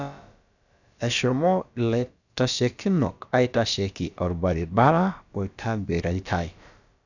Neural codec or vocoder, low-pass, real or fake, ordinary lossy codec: codec, 16 kHz, about 1 kbps, DyCAST, with the encoder's durations; 7.2 kHz; fake; none